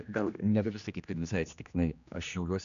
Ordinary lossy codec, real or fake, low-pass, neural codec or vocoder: AAC, 96 kbps; fake; 7.2 kHz; codec, 16 kHz, 1 kbps, X-Codec, HuBERT features, trained on general audio